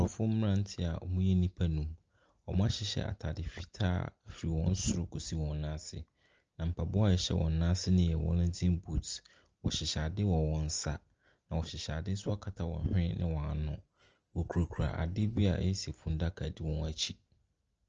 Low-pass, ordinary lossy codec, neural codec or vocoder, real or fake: 7.2 kHz; Opus, 24 kbps; none; real